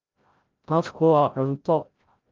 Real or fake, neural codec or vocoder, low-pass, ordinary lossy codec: fake; codec, 16 kHz, 0.5 kbps, FreqCodec, larger model; 7.2 kHz; Opus, 32 kbps